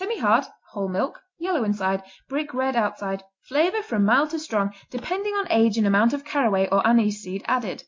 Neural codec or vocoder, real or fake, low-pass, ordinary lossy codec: none; real; 7.2 kHz; MP3, 48 kbps